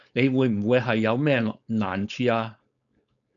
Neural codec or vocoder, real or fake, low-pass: codec, 16 kHz, 4.8 kbps, FACodec; fake; 7.2 kHz